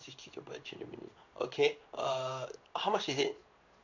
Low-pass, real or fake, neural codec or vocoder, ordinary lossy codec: 7.2 kHz; fake; codec, 16 kHz in and 24 kHz out, 1 kbps, XY-Tokenizer; none